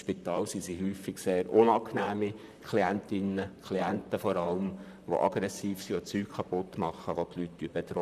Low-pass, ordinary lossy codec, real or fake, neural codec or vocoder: 14.4 kHz; none; fake; vocoder, 44.1 kHz, 128 mel bands, Pupu-Vocoder